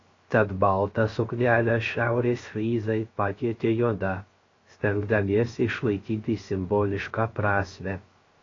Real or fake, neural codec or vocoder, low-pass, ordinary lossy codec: fake; codec, 16 kHz, 0.3 kbps, FocalCodec; 7.2 kHz; AAC, 32 kbps